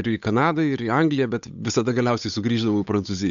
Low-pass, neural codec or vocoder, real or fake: 7.2 kHz; codec, 16 kHz, 8 kbps, FunCodec, trained on Chinese and English, 25 frames a second; fake